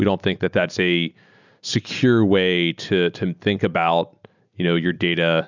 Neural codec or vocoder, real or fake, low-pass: none; real; 7.2 kHz